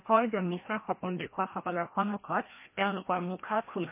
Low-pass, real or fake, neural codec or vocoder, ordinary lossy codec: 3.6 kHz; fake; codec, 16 kHz, 1 kbps, FreqCodec, larger model; MP3, 24 kbps